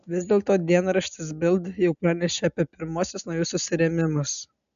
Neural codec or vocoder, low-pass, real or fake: none; 7.2 kHz; real